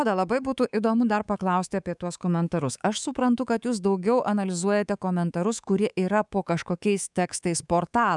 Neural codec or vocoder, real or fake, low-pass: codec, 24 kHz, 3.1 kbps, DualCodec; fake; 10.8 kHz